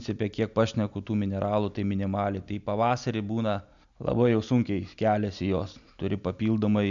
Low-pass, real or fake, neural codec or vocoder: 7.2 kHz; real; none